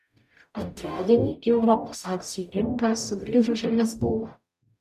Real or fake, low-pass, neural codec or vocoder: fake; 14.4 kHz; codec, 44.1 kHz, 0.9 kbps, DAC